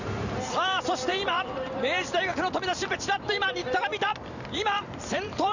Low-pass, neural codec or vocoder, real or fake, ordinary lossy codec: 7.2 kHz; vocoder, 44.1 kHz, 128 mel bands every 512 samples, BigVGAN v2; fake; none